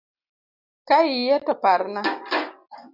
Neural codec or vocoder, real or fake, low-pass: none; real; 5.4 kHz